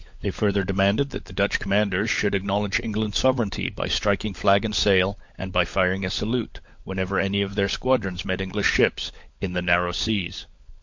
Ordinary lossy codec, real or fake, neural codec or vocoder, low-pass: MP3, 48 kbps; fake; codec, 16 kHz, 16 kbps, FunCodec, trained on LibriTTS, 50 frames a second; 7.2 kHz